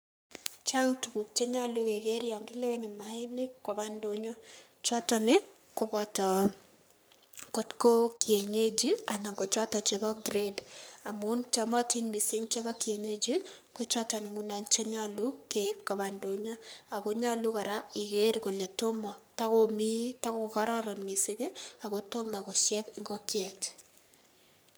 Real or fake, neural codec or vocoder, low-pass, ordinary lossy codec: fake; codec, 44.1 kHz, 3.4 kbps, Pupu-Codec; none; none